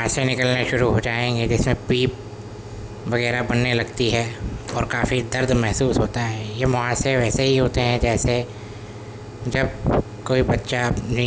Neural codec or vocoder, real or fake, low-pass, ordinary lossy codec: none; real; none; none